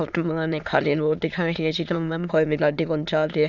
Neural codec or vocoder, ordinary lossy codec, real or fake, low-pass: autoencoder, 22.05 kHz, a latent of 192 numbers a frame, VITS, trained on many speakers; none; fake; 7.2 kHz